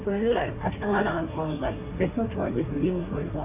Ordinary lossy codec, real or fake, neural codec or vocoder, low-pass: none; fake; codec, 24 kHz, 1 kbps, SNAC; 3.6 kHz